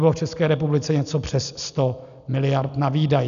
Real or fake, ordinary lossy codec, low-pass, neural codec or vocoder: real; AAC, 96 kbps; 7.2 kHz; none